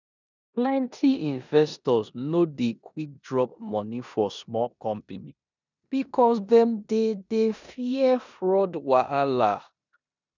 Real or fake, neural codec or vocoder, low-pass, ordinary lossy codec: fake; codec, 16 kHz in and 24 kHz out, 0.9 kbps, LongCat-Audio-Codec, four codebook decoder; 7.2 kHz; none